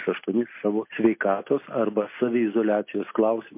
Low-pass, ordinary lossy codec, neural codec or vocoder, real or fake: 3.6 kHz; MP3, 24 kbps; none; real